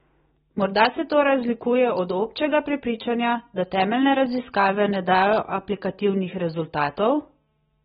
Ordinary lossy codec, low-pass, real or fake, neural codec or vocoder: AAC, 16 kbps; 19.8 kHz; real; none